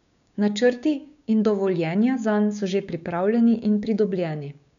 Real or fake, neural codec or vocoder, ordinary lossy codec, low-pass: fake; codec, 16 kHz, 6 kbps, DAC; none; 7.2 kHz